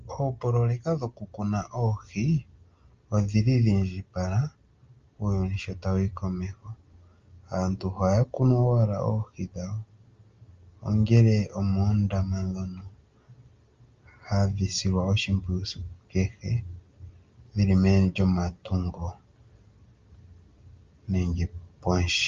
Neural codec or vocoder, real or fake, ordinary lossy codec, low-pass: none; real; Opus, 24 kbps; 7.2 kHz